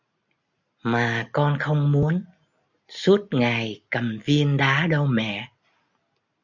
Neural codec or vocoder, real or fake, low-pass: none; real; 7.2 kHz